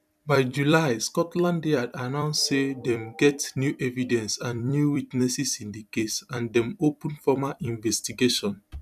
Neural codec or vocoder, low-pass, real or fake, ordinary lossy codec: vocoder, 44.1 kHz, 128 mel bands every 256 samples, BigVGAN v2; 14.4 kHz; fake; none